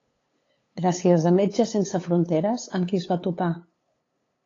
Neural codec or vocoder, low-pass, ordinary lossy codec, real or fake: codec, 16 kHz, 8 kbps, FunCodec, trained on LibriTTS, 25 frames a second; 7.2 kHz; AAC, 32 kbps; fake